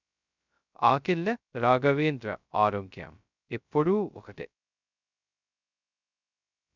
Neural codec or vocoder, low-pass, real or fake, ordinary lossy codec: codec, 16 kHz, 0.2 kbps, FocalCodec; 7.2 kHz; fake; none